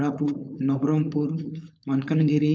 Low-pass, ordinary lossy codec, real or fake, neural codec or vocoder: none; none; fake; codec, 16 kHz, 4.8 kbps, FACodec